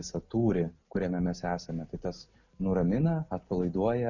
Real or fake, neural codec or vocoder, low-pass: real; none; 7.2 kHz